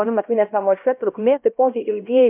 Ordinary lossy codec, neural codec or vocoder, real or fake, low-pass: MP3, 32 kbps; codec, 16 kHz, 1 kbps, X-Codec, HuBERT features, trained on LibriSpeech; fake; 3.6 kHz